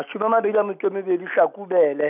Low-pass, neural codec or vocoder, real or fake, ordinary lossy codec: 3.6 kHz; none; real; none